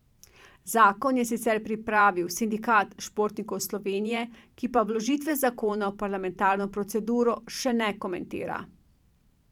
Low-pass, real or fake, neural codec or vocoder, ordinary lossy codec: 19.8 kHz; fake; vocoder, 44.1 kHz, 128 mel bands every 512 samples, BigVGAN v2; none